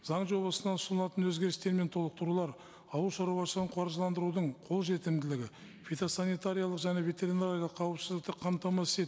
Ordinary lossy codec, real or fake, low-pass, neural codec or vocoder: none; real; none; none